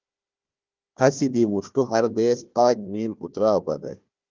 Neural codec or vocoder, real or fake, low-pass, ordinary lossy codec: codec, 16 kHz, 1 kbps, FunCodec, trained on Chinese and English, 50 frames a second; fake; 7.2 kHz; Opus, 32 kbps